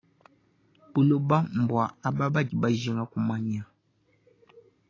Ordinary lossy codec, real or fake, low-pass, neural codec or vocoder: AAC, 32 kbps; real; 7.2 kHz; none